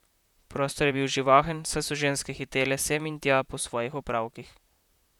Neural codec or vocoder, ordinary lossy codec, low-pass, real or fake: vocoder, 44.1 kHz, 128 mel bands, Pupu-Vocoder; none; 19.8 kHz; fake